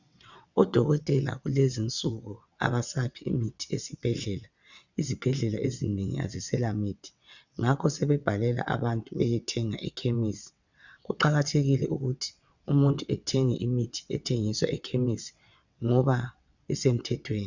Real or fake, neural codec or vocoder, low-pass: fake; vocoder, 22.05 kHz, 80 mel bands, WaveNeXt; 7.2 kHz